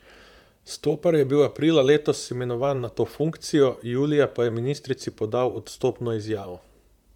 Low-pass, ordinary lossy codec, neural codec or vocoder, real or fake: 19.8 kHz; MP3, 96 kbps; vocoder, 44.1 kHz, 128 mel bands, Pupu-Vocoder; fake